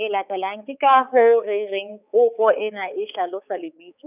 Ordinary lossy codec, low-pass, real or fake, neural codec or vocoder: none; 3.6 kHz; fake; codec, 16 kHz, 4 kbps, X-Codec, HuBERT features, trained on balanced general audio